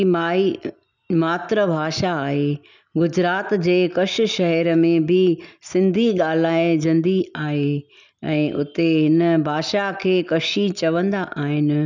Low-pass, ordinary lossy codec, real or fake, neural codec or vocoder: 7.2 kHz; none; real; none